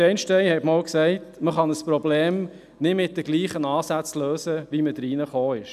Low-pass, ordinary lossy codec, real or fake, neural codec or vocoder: 14.4 kHz; none; real; none